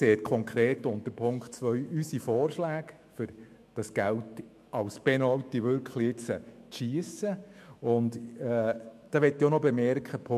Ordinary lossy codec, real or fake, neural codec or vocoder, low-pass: MP3, 64 kbps; fake; autoencoder, 48 kHz, 128 numbers a frame, DAC-VAE, trained on Japanese speech; 14.4 kHz